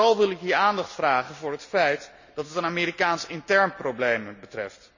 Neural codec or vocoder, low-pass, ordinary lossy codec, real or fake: none; 7.2 kHz; none; real